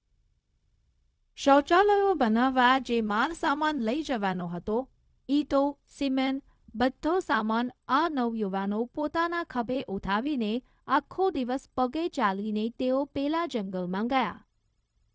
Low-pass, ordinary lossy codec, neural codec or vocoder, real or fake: none; none; codec, 16 kHz, 0.4 kbps, LongCat-Audio-Codec; fake